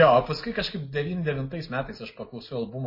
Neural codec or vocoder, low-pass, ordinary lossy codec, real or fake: none; 5.4 kHz; MP3, 24 kbps; real